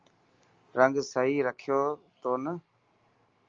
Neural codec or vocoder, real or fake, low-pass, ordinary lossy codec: none; real; 7.2 kHz; Opus, 32 kbps